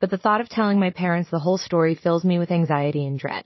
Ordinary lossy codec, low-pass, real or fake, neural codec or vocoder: MP3, 24 kbps; 7.2 kHz; fake; vocoder, 44.1 kHz, 80 mel bands, Vocos